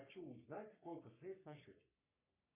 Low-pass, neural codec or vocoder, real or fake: 3.6 kHz; codec, 44.1 kHz, 3.4 kbps, Pupu-Codec; fake